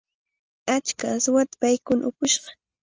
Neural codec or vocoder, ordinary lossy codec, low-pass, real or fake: none; Opus, 24 kbps; 7.2 kHz; real